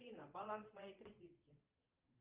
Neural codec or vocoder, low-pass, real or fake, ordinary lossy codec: vocoder, 22.05 kHz, 80 mel bands, Vocos; 3.6 kHz; fake; Opus, 24 kbps